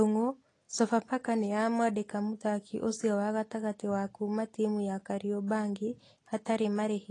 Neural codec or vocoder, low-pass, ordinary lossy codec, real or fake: none; 10.8 kHz; AAC, 32 kbps; real